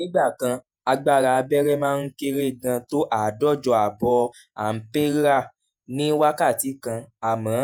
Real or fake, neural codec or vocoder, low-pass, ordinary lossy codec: fake; vocoder, 48 kHz, 128 mel bands, Vocos; none; none